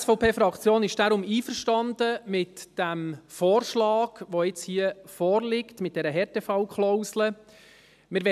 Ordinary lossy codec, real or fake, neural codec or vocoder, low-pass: MP3, 96 kbps; real; none; 14.4 kHz